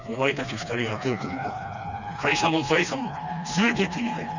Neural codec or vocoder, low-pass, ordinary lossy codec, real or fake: codec, 16 kHz, 2 kbps, FreqCodec, smaller model; 7.2 kHz; none; fake